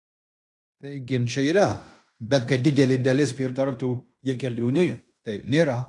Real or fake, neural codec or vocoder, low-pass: fake; codec, 16 kHz in and 24 kHz out, 0.9 kbps, LongCat-Audio-Codec, fine tuned four codebook decoder; 10.8 kHz